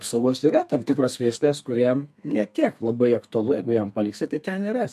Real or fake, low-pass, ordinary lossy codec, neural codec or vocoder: fake; 14.4 kHz; MP3, 96 kbps; codec, 32 kHz, 1.9 kbps, SNAC